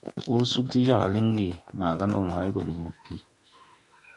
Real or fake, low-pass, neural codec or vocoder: fake; 10.8 kHz; autoencoder, 48 kHz, 32 numbers a frame, DAC-VAE, trained on Japanese speech